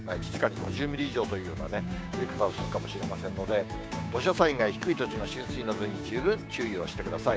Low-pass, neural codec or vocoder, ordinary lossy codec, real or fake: none; codec, 16 kHz, 6 kbps, DAC; none; fake